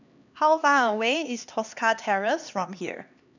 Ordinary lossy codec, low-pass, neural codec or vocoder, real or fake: none; 7.2 kHz; codec, 16 kHz, 2 kbps, X-Codec, HuBERT features, trained on LibriSpeech; fake